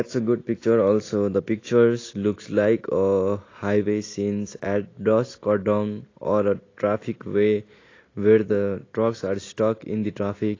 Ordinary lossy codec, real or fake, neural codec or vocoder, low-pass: AAC, 32 kbps; real; none; 7.2 kHz